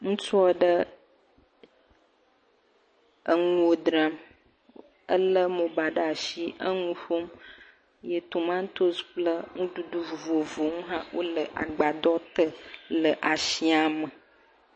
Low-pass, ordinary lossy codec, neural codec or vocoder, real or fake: 9.9 kHz; MP3, 32 kbps; none; real